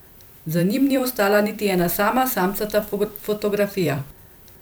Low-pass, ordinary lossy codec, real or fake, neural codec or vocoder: none; none; fake; vocoder, 44.1 kHz, 128 mel bands every 256 samples, BigVGAN v2